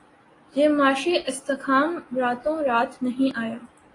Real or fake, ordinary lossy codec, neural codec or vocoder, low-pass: real; AAC, 32 kbps; none; 10.8 kHz